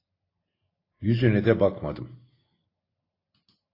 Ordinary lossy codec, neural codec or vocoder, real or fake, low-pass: AAC, 24 kbps; none; real; 5.4 kHz